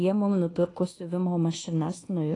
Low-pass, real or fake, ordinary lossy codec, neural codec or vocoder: 10.8 kHz; fake; AAC, 32 kbps; codec, 24 kHz, 1.2 kbps, DualCodec